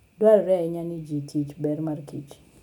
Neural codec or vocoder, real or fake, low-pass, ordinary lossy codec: none; real; 19.8 kHz; none